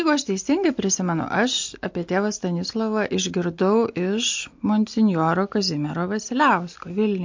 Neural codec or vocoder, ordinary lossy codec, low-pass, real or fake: none; MP3, 48 kbps; 7.2 kHz; real